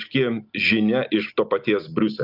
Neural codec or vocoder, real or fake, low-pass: none; real; 5.4 kHz